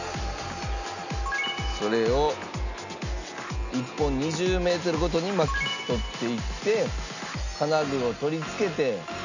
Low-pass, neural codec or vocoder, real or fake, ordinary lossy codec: 7.2 kHz; none; real; none